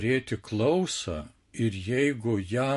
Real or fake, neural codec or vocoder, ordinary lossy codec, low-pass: real; none; MP3, 48 kbps; 14.4 kHz